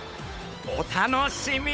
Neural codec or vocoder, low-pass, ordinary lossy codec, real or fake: codec, 16 kHz, 8 kbps, FunCodec, trained on Chinese and English, 25 frames a second; none; none; fake